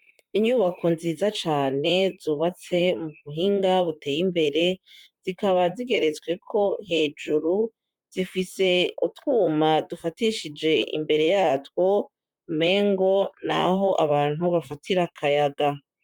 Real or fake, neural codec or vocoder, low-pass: fake; vocoder, 44.1 kHz, 128 mel bands, Pupu-Vocoder; 19.8 kHz